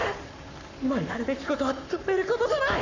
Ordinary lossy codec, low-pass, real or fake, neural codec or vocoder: none; 7.2 kHz; fake; codec, 44.1 kHz, 7.8 kbps, Pupu-Codec